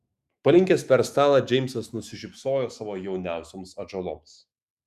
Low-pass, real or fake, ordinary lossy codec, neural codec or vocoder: 14.4 kHz; fake; Opus, 64 kbps; autoencoder, 48 kHz, 128 numbers a frame, DAC-VAE, trained on Japanese speech